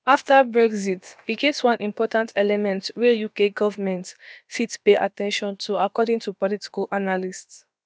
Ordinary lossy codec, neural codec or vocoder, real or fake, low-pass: none; codec, 16 kHz, about 1 kbps, DyCAST, with the encoder's durations; fake; none